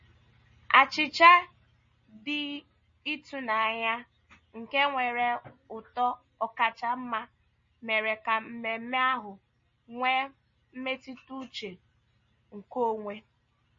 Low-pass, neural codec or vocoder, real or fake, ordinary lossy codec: 7.2 kHz; none; real; MP3, 32 kbps